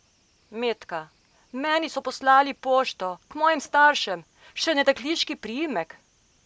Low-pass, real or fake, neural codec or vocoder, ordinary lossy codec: none; real; none; none